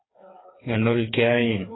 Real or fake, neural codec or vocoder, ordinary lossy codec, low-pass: fake; codec, 16 kHz, 4 kbps, FreqCodec, smaller model; AAC, 16 kbps; 7.2 kHz